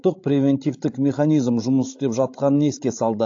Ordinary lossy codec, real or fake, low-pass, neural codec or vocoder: AAC, 48 kbps; fake; 7.2 kHz; codec, 16 kHz, 16 kbps, FreqCodec, larger model